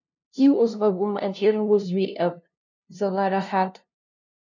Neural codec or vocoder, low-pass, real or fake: codec, 16 kHz, 0.5 kbps, FunCodec, trained on LibriTTS, 25 frames a second; 7.2 kHz; fake